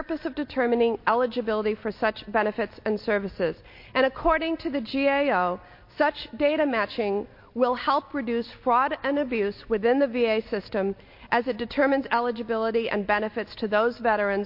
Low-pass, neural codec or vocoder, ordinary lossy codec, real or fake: 5.4 kHz; none; MP3, 32 kbps; real